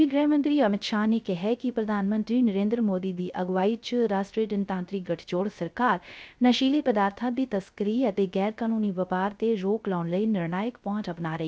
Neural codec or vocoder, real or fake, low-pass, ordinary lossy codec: codec, 16 kHz, 0.3 kbps, FocalCodec; fake; none; none